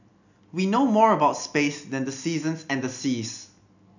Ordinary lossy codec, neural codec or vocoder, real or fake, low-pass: none; none; real; 7.2 kHz